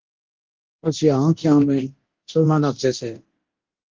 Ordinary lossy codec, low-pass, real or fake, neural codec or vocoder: Opus, 16 kbps; 7.2 kHz; fake; codec, 24 kHz, 0.9 kbps, DualCodec